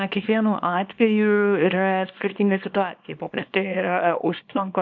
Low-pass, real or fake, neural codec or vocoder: 7.2 kHz; fake; codec, 24 kHz, 0.9 kbps, WavTokenizer, small release